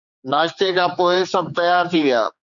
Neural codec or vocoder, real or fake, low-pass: codec, 16 kHz, 4 kbps, X-Codec, HuBERT features, trained on general audio; fake; 7.2 kHz